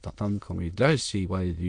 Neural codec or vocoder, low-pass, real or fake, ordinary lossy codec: autoencoder, 22.05 kHz, a latent of 192 numbers a frame, VITS, trained on many speakers; 9.9 kHz; fake; AAC, 64 kbps